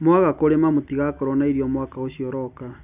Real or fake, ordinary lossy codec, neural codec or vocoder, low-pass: real; none; none; 3.6 kHz